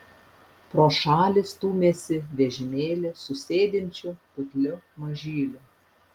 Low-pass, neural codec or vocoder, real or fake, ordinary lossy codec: 19.8 kHz; none; real; Opus, 32 kbps